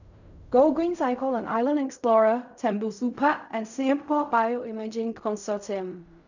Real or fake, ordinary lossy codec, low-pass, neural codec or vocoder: fake; none; 7.2 kHz; codec, 16 kHz in and 24 kHz out, 0.4 kbps, LongCat-Audio-Codec, fine tuned four codebook decoder